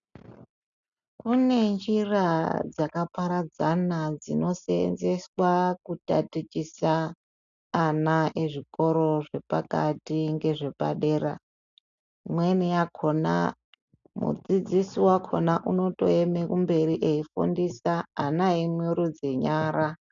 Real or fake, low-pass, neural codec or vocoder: real; 7.2 kHz; none